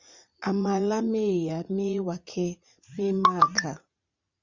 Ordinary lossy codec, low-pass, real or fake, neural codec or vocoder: Opus, 64 kbps; 7.2 kHz; fake; vocoder, 44.1 kHz, 80 mel bands, Vocos